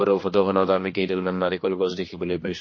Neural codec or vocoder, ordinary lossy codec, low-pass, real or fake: codec, 16 kHz, 2 kbps, X-Codec, HuBERT features, trained on general audio; MP3, 32 kbps; 7.2 kHz; fake